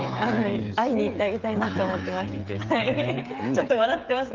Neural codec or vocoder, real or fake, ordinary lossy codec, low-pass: codec, 16 kHz, 8 kbps, FreqCodec, smaller model; fake; Opus, 24 kbps; 7.2 kHz